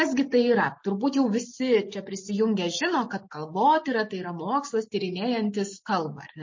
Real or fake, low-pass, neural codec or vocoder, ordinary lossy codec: real; 7.2 kHz; none; MP3, 32 kbps